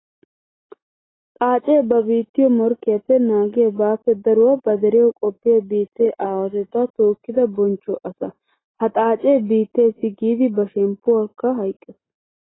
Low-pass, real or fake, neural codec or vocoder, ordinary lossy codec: 7.2 kHz; real; none; AAC, 16 kbps